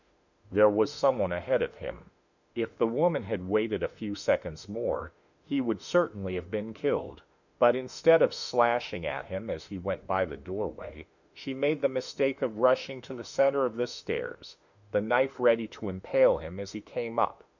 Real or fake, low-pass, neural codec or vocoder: fake; 7.2 kHz; autoencoder, 48 kHz, 32 numbers a frame, DAC-VAE, trained on Japanese speech